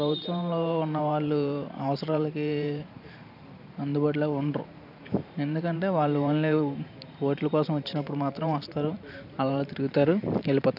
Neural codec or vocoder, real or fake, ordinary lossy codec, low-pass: vocoder, 44.1 kHz, 128 mel bands every 512 samples, BigVGAN v2; fake; none; 5.4 kHz